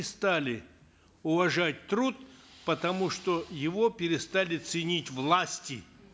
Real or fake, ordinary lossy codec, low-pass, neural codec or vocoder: real; none; none; none